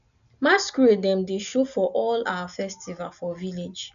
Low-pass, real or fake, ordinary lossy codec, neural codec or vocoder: 7.2 kHz; real; none; none